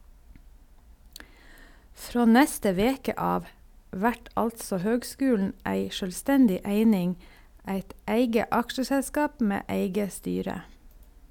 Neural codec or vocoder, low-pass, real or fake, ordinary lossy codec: none; 19.8 kHz; real; none